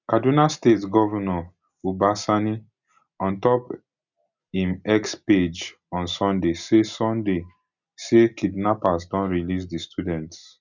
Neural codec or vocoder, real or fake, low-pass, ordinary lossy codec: none; real; 7.2 kHz; none